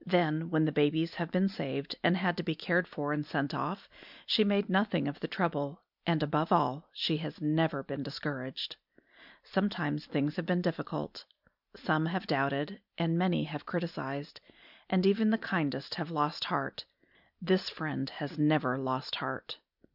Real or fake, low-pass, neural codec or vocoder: real; 5.4 kHz; none